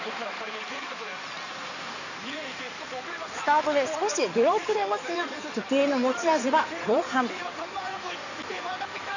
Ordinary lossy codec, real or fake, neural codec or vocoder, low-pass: none; fake; codec, 16 kHz in and 24 kHz out, 2.2 kbps, FireRedTTS-2 codec; 7.2 kHz